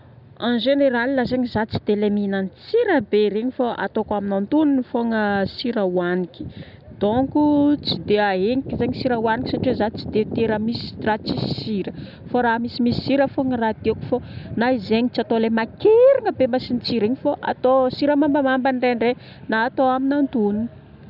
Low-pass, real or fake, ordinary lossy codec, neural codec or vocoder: 5.4 kHz; real; none; none